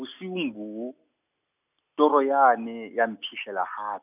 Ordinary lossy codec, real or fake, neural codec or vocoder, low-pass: none; real; none; 3.6 kHz